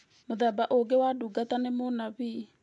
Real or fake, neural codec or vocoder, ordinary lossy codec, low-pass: real; none; none; 10.8 kHz